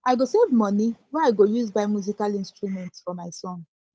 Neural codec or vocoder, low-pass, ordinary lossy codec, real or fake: codec, 16 kHz, 8 kbps, FunCodec, trained on Chinese and English, 25 frames a second; none; none; fake